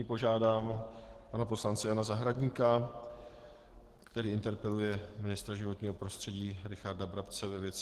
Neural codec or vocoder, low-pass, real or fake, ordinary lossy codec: codec, 44.1 kHz, 7.8 kbps, DAC; 14.4 kHz; fake; Opus, 16 kbps